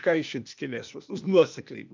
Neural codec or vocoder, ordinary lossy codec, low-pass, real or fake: codec, 16 kHz, 0.8 kbps, ZipCodec; MP3, 64 kbps; 7.2 kHz; fake